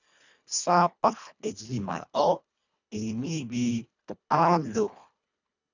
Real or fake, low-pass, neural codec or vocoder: fake; 7.2 kHz; codec, 24 kHz, 1.5 kbps, HILCodec